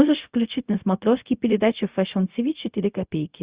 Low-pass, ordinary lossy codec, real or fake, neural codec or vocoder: 3.6 kHz; Opus, 64 kbps; fake; codec, 16 kHz, 0.4 kbps, LongCat-Audio-Codec